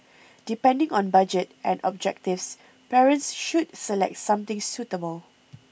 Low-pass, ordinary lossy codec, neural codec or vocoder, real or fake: none; none; none; real